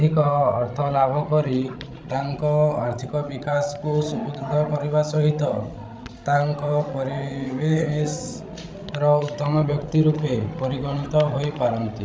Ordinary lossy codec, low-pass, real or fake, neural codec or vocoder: none; none; fake; codec, 16 kHz, 16 kbps, FreqCodec, larger model